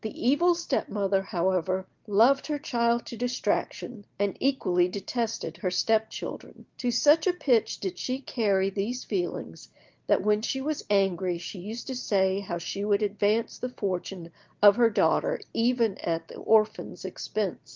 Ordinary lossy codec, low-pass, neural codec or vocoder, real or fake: Opus, 32 kbps; 7.2 kHz; none; real